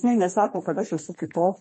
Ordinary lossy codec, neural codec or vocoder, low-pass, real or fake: MP3, 32 kbps; codec, 32 kHz, 1.9 kbps, SNAC; 10.8 kHz; fake